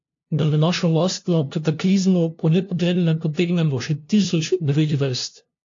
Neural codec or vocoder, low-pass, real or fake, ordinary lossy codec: codec, 16 kHz, 0.5 kbps, FunCodec, trained on LibriTTS, 25 frames a second; 7.2 kHz; fake; AAC, 48 kbps